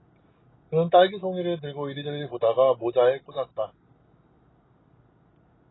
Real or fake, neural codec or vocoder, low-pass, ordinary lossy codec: real; none; 7.2 kHz; AAC, 16 kbps